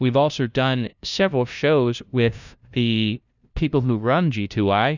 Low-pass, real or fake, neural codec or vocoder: 7.2 kHz; fake; codec, 16 kHz, 0.5 kbps, FunCodec, trained on LibriTTS, 25 frames a second